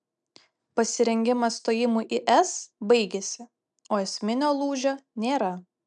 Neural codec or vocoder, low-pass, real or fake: none; 9.9 kHz; real